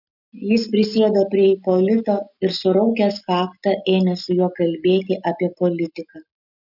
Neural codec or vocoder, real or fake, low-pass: none; real; 5.4 kHz